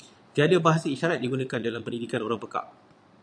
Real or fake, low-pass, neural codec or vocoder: fake; 9.9 kHz; vocoder, 22.05 kHz, 80 mel bands, Vocos